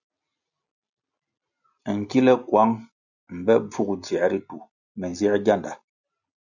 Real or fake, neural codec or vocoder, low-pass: real; none; 7.2 kHz